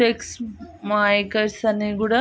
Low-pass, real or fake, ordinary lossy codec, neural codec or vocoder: none; real; none; none